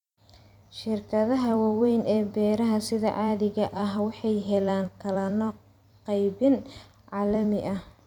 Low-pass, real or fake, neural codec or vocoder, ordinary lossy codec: 19.8 kHz; fake; vocoder, 44.1 kHz, 128 mel bands every 256 samples, BigVGAN v2; none